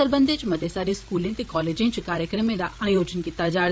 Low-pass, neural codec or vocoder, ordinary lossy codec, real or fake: none; codec, 16 kHz, 8 kbps, FreqCodec, larger model; none; fake